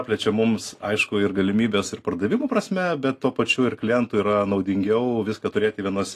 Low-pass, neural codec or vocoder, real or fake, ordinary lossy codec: 14.4 kHz; none; real; AAC, 48 kbps